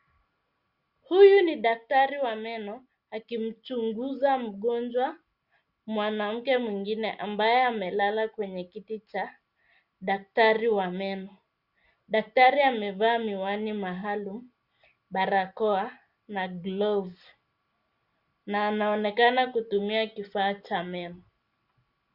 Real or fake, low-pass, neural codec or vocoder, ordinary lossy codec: real; 5.4 kHz; none; Opus, 64 kbps